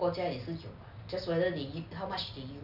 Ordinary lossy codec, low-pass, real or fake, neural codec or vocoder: none; 5.4 kHz; real; none